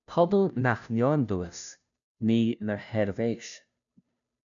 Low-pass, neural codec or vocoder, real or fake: 7.2 kHz; codec, 16 kHz, 0.5 kbps, FunCodec, trained on Chinese and English, 25 frames a second; fake